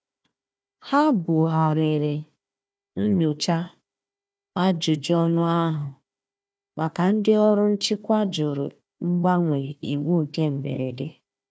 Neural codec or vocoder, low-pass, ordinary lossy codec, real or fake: codec, 16 kHz, 1 kbps, FunCodec, trained on Chinese and English, 50 frames a second; none; none; fake